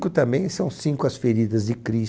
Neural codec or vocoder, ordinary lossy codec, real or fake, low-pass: none; none; real; none